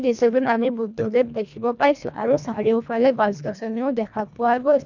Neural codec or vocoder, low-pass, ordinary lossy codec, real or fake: codec, 24 kHz, 1.5 kbps, HILCodec; 7.2 kHz; none; fake